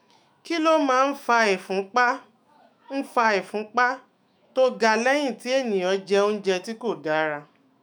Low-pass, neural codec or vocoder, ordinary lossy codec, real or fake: none; autoencoder, 48 kHz, 128 numbers a frame, DAC-VAE, trained on Japanese speech; none; fake